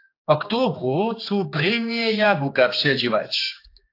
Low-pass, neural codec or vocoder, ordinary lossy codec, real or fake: 5.4 kHz; codec, 16 kHz, 2 kbps, X-Codec, HuBERT features, trained on general audio; AAC, 32 kbps; fake